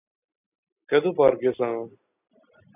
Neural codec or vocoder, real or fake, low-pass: none; real; 3.6 kHz